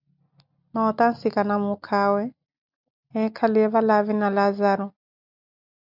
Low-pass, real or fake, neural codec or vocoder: 5.4 kHz; real; none